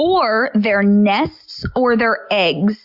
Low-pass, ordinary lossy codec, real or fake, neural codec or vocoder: 5.4 kHz; Opus, 64 kbps; real; none